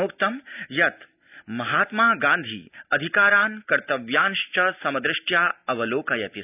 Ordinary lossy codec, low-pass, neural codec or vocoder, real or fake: none; 3.6 kHz; none; real